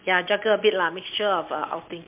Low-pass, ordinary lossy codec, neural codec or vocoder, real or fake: 3.6 kHz; MP3, 32 kbps; none; real